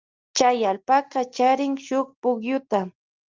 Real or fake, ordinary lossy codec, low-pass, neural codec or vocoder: real; Opus, 24 kbps; 7.2 kHz; none